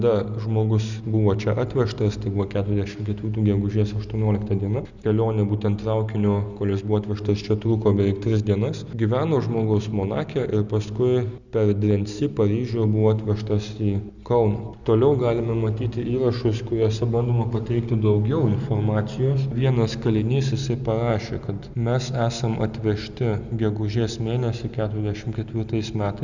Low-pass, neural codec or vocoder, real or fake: 7.2 kHz; none; real